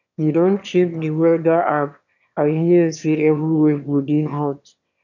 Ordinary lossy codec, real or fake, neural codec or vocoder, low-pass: none; fake; autoencoder, 22.05 kHz, a latent of 192 numbers a frame, VITS, trained on one speaker; 7.2 kHz